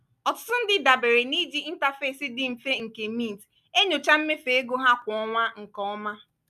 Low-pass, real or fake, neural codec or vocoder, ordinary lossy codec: 14.4 kHz; real; none; none